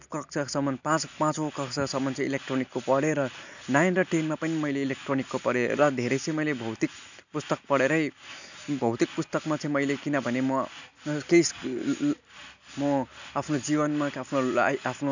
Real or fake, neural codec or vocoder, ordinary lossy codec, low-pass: real; none; none; 7.2 kHz